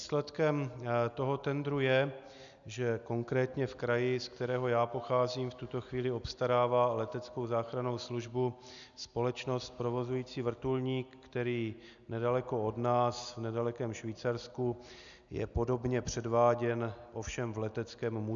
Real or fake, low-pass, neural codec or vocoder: real; 7.2 kHz; none